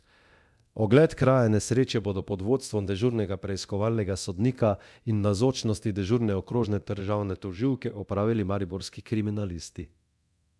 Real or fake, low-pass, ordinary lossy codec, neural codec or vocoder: fake; none; none; codec, 24 kHz, 0.9 kbps, DualCodec